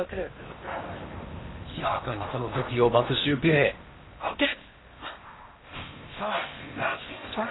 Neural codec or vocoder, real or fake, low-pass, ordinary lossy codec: codec, 16 kHz in and 24 kHz out, 0.8 kbps, FocalCodec, streaming, 65536 codes; fake; 7.2 kHz; AAC, 16 kbps